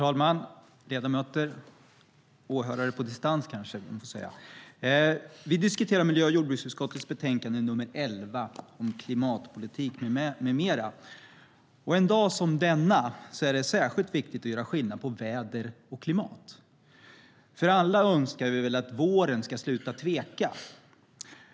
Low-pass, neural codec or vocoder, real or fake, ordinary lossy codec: none; none; real; none